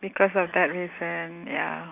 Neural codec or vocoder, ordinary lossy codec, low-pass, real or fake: none; none; 3.6 kHz; real